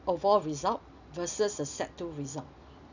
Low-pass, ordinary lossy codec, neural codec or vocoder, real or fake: 7.2 kHz; none; none; real